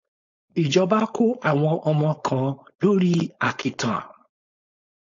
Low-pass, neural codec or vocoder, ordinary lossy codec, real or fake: 7.2 kHz; codec, 16 kHz, 4.8 kbps, FACodec; AAC, 48 kbps; fake